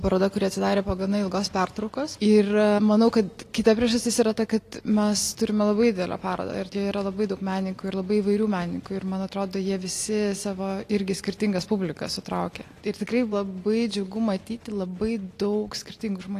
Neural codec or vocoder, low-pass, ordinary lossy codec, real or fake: none; 14.4 kHz; AAC, 48 kbps; real